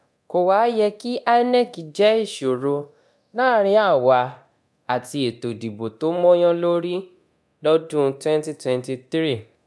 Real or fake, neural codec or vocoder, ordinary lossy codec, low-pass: fake; codec, 24 kHz, 0.9 kbps, DualCodec; none; none